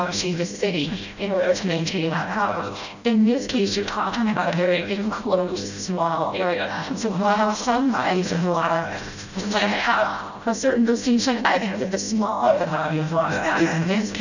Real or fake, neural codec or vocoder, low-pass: fake; codec, 16 kHz, 0.5 kbps, FreqCodec, smaller model; 7.2 kHz